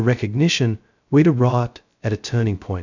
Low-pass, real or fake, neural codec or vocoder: 7.2 kHz; fake; codec, 16 kHz, 0.2 kbps, FocalCodec